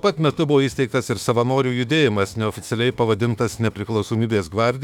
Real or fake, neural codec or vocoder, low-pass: fake; autoencoder, 48 kHz, 32 numbers a frame, DAC-VAE, trained on Japanese speech; 19.8 kHz